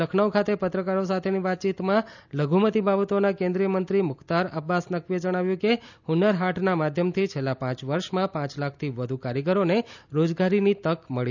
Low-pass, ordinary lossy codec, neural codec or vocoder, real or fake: 7.2 kHz; none; none; real